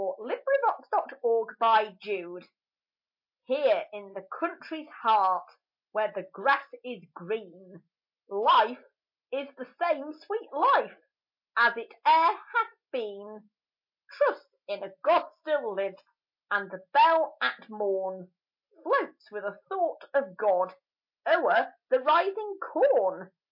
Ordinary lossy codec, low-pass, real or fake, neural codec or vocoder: MP3, 32 kbps; 5.4 kHz; real; none